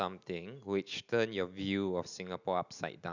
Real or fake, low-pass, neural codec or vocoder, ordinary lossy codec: real; 7.2 kHz; none; none